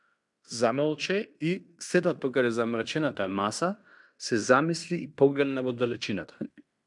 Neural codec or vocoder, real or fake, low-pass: codec, 16 kHz in and 24 kHz out, 0.9 kbps, LongCat-Audio-Codec, fine tuned four codebook decoder; fake; 10.8 kHz